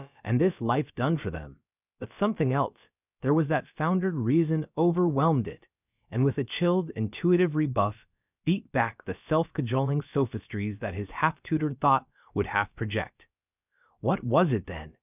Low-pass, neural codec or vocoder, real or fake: 3.6 kHz; codec, 16 kHz, about 1 kbps, DyCAST, with the encoder's durations; fake